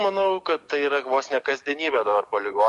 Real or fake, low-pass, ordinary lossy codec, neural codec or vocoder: fake; 14.4 kHz; MP3, 48 kbps; codec, 44.1 kHz, 7.8 kbps, DAC